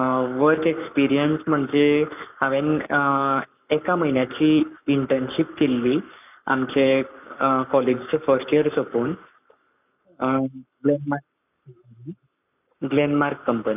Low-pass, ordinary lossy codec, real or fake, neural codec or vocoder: 3.6 kHz; none; fake; codec, 44.1 kHz, 7.8 kbps, Pupu-Codec